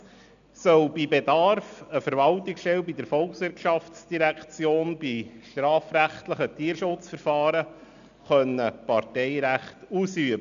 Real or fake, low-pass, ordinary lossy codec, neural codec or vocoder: real; 7.2 kHz; none; none